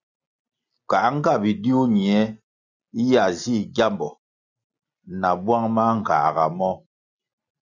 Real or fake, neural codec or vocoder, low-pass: real; none; 7.2 kHz